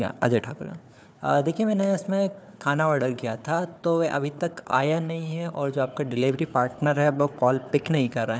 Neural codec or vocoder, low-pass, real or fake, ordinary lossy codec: codec, 16 kHz, 4 kbps, FunCodec, trained on Chinese and English, 50 frames a second; none; fake; none